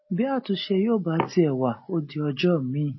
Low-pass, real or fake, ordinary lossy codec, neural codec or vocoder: 7.2 kHz; real; MP3, 24 kbps; none